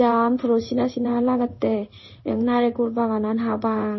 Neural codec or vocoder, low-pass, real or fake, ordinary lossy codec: codec, 16 kHz in and 24 kHz out, 1 kbps, XY-Tokenizer; 7.2 kHz; fake; MP3, 24 kbps